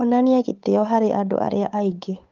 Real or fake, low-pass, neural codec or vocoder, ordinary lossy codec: fake; 7.2 kHz; codec, 16 kHz, 8 kbps, FunCodec, trained on Chinese and English, 25 frames a second; Opus, 32 kbps